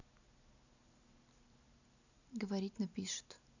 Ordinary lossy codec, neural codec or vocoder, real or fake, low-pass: none; none; real; 7.2 kHz